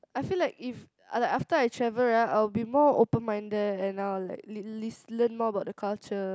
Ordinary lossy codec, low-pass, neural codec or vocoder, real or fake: none; none; none; real